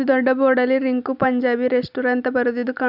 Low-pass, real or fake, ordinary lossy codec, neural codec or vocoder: 5.4 kHz; real; none; none